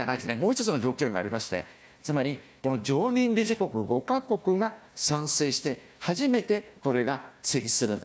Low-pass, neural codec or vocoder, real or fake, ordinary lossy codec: none; codec, 16 kHz, 1 kbps, FunCodec, trained on Chinese and English, 50 frames a second; fake; none